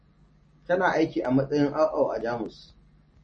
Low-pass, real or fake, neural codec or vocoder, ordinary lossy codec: 10.8 kHz; real; none; MP3, 32 kbps